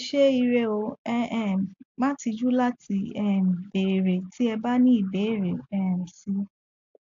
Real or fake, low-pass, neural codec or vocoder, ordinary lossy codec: real; 7.2 kHz; none; AAC, 48 kbps